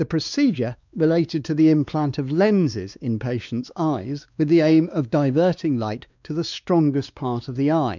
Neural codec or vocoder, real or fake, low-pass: codec, 16 kHz, 2 kbps, X-Codec, WavLM features, trained on Multilingual LibriSpeech; fake; 7.2 kHz